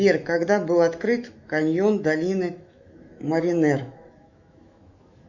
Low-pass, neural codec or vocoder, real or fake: 7.2 kHz; autoencoder, 48 kHz, 128 numbers a frame, DAC-VAE, trained on Japanese speech; fake